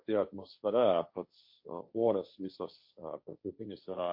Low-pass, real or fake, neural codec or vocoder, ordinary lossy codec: 5.4 kHz; fake; codec, 16 kHz, 1.1 kbps, Voila-Tokenizer; MP3, 32 kbps